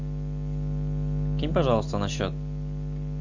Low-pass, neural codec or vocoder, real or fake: 7.2 kHz; none; real